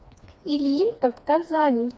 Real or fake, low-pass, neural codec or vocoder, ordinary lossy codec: fake; none; codec, 16 kHz, 2 kbps, FreqCodec, smaller model; none